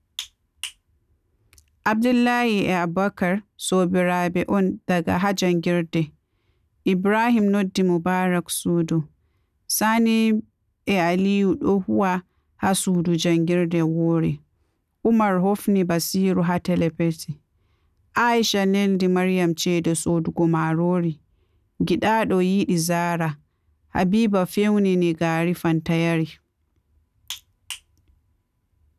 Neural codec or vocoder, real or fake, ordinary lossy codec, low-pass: none; real; none; 14.4 kHz